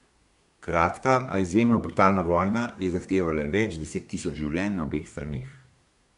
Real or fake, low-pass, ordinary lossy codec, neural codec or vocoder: fake; 10.8 kHz; none; codec, 24 kHz, 1 kbps, SNAC